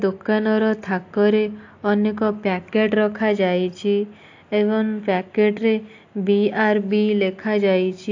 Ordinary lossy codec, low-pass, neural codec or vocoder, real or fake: AAC, 48 kbps; 7.2 kHz; none; real